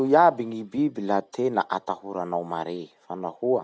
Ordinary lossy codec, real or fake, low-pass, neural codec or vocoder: none; real; none; none